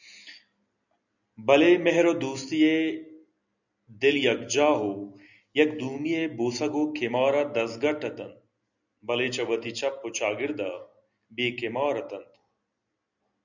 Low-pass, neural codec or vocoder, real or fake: 7.2 kHz; none; real